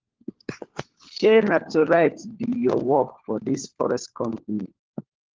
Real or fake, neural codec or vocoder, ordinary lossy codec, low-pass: fake; codec, 16 kHz, 4 kbps, FunCodec, trained on LibriTTS, 50 frames a second; Opus, 16 kbps; 7.2 kHz